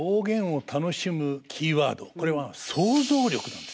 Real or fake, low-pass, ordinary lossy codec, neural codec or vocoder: real; none; none; none